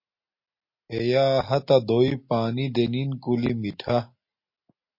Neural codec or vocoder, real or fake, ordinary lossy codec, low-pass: none; real; MP3, 32 kbps; 5.4 kHz